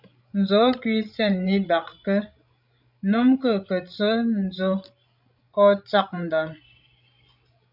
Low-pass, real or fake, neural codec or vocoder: 5.4 kHz; fake; codec, 16 kHz, 16 kbps, FreqCodec, larger model